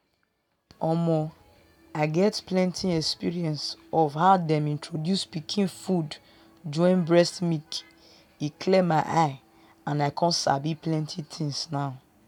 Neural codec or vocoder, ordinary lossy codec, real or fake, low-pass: none; none; real; 19.8 kHz